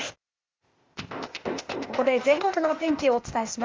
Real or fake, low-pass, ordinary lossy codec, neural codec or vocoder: fake; 7.2 kHz; Opus, 32 kbps; codec, 16 kHz, 0.8 kbps, ZipCodec